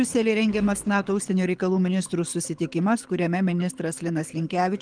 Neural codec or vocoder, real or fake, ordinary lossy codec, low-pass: codec, 24 kHz, 6 kbps, HILCodec; fake; Opus, 24 kbps; 9.9 kHz